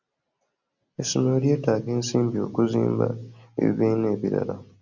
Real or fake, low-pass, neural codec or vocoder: real; 7.2 kHz; none